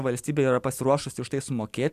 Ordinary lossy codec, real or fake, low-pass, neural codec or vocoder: AAC, 96 kbps; real; 14.4 kHz; none